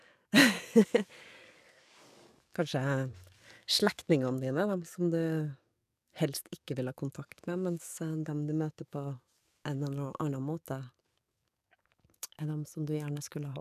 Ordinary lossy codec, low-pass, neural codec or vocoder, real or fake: none; 14.4 kHz; codec, 44.1 kHz, 7.8 kbps, DAC; fake